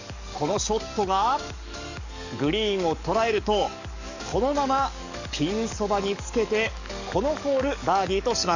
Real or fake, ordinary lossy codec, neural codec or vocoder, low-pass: fake; none; codec, 44.1 kHz, 7.8 kbps, DAC; 7.2 kHz